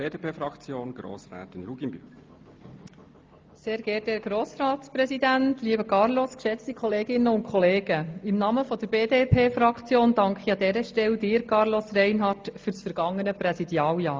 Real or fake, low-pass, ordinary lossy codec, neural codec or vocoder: real; 7.2 kHz; Opus, 32 kbps; none